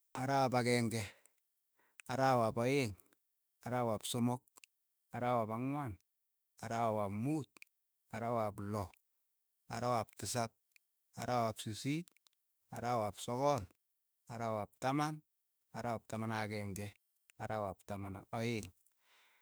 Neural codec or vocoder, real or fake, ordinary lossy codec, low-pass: autoencoder, 48 kHz, 32 numbers a frame, DAC-VAE, trained on Japanese speech; fake; none; none